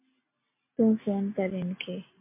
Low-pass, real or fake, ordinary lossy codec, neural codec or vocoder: 3.6 kHz; real; MP3, 32 kbps; none